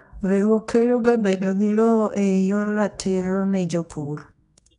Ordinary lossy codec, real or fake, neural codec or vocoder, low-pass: none; fake; codec, 24 kHz, 0.9 kbps, WavTokenizer, medium music audio release; 10.8 kHz